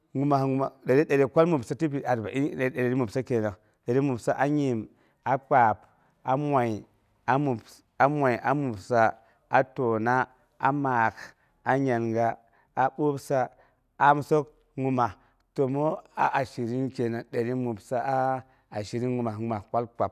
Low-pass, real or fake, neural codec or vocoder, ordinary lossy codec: 14.4 kHz; real; none; none